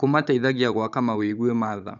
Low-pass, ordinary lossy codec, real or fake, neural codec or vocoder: 7.2 kHz; none; fake; codec, 16 kHz, 16 kbps, FunCodec, trained on Chinese and English, 50 frames a second